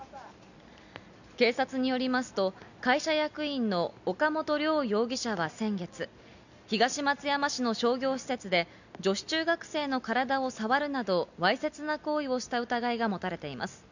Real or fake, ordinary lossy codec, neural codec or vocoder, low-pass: real; none; none; 7.2 kHz